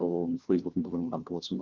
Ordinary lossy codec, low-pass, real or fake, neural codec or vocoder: Opus, 24 kbps; 7.2 kHz; fake; codec, 16 kHz, 1 kbps, FunCodec, trained on LibriTTS, 50 frames a second